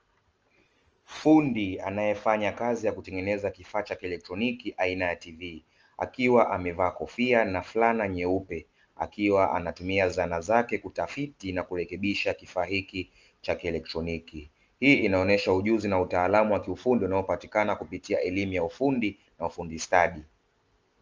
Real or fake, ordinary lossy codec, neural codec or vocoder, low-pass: real; Opus, 24 kbps; none; 7.2 kHz